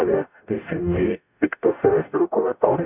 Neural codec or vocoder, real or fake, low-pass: codec, 44.1 kHz, 0.9 kbps, DAC; fake; 3.6 kHz